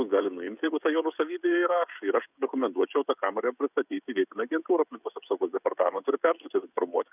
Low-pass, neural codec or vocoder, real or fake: 3.6 kHz; codec, 16 kHz, 16 kbps, FreqCodec, smaller model; fake